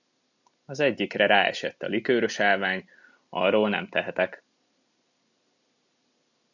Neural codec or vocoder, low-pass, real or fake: none; 7.2 kHz; real